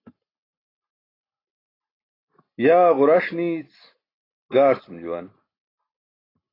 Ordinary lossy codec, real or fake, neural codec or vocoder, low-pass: AAC, 24 kbps; real; none; 5.4 kHz